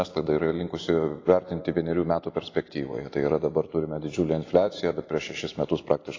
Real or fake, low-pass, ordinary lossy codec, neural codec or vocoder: real; 7.2 kHz; AAC, 32 kbps; none